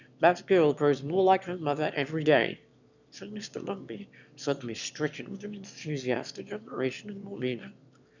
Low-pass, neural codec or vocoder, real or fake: 7.2 kHz; autoencoder, 22.05 kHz, a latent of 192 numbers a frame, VITS, trained on one speaker; fake